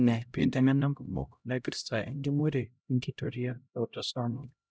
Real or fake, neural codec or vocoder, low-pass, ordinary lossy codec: fake; codec, 16 kHz, 0.5 kbps, X-Codec, HuBERT features, trained on LibriSpeech; none; none